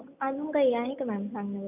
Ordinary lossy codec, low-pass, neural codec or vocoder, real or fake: none; 3.6 kHz; none; real